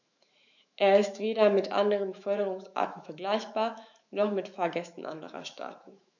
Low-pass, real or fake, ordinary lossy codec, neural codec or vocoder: 7.2 kHz; fake; none; autoencoder, 48 kHz, 128 numbers a frame, DAC-VAE, trained on Japanese speech